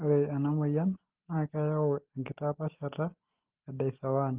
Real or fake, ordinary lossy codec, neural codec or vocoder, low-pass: real; Opus, 16 kbps; none; 3.6 kHz